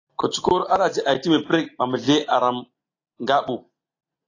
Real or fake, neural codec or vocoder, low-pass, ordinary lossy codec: real; none; 7.2 kHz; AAC, 32 kbps